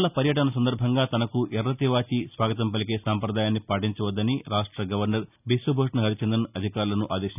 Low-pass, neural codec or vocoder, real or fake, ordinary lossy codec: 3.6 kHz; none; real; none